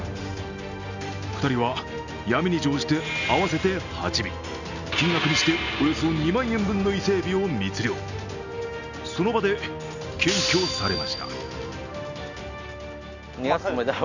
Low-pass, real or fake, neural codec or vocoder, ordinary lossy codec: 7.2 kHz; real; none; none